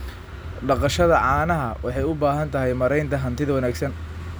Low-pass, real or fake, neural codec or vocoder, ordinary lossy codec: none; real; none; none